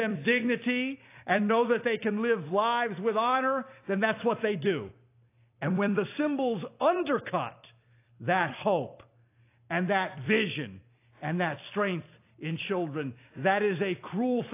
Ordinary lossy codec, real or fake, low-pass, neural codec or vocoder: AAC, 24 kbps; fake; 3.6 kHz; vocoder, 44.1 kHz, 128 mel bands every 256 samples, BigVGAN v2